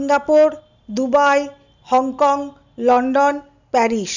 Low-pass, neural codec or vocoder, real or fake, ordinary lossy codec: 7.2 kHz; none; real; none